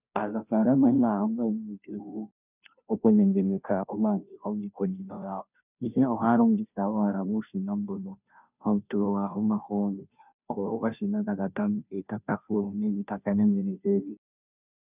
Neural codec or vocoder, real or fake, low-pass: codec, 16 kHz, 0.5 kbps, FunCodec, trained on Chinese and English, 25 frames a second; fake; 3.6 kHz